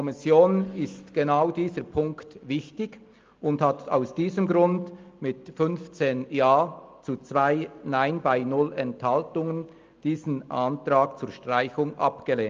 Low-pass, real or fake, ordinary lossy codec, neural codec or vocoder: 7.2 kHz; real; Opus, 24 kbps; none